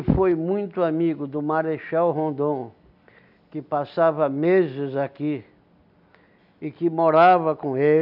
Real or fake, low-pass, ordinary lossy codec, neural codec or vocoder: real; 5.4 kHz; none; none